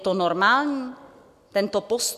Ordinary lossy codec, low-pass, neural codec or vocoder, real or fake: MP3, 96 kbps; 14.4 kHz; none; real